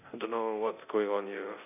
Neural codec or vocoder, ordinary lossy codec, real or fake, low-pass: codec, 24 kHz, 0.9 kbps, DualCodec; none; fake; 3.6 kHz